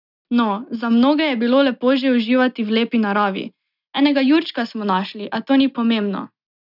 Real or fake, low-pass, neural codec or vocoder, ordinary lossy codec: real; 5.4 kHz; none; none